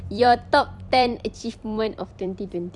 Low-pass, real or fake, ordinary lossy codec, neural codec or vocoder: 10.8 kHz; fake; none; vocoder, 44.1 kHz, 128 mel bands every 256 samples, BigVGAN v2